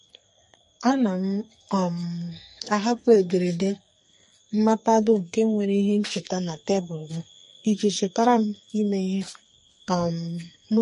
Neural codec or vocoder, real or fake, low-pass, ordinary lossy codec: codec, 44.1 kHz, 2.6 kbps, SNAC; fake; 14.4 kHz; MP3, 48 kbps